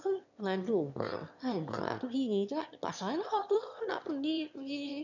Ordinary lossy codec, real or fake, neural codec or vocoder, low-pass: none; fake; autoencoder, 22.05 kHz, a latent of 192 numbers a frame, VITS, trained on one speaker; 7.2 kHz